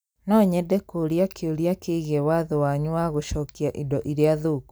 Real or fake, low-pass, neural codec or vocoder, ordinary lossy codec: real; none; none; none